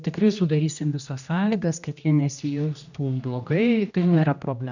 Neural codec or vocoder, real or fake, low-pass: codec, 16 kHz, 1 kbps, X-Codec, HuBERT features, trained on general audio; fake; 7.2 kHz